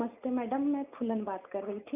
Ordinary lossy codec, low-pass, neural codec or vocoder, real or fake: none; 3.6 kHz; none; real